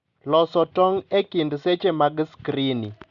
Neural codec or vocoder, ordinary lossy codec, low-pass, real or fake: none; Opus, 64 kbps; 7.2 kHz; real